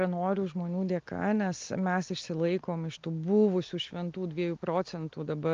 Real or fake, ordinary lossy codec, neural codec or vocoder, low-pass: real; Opus, 24 kbps; none; 7.2 kHz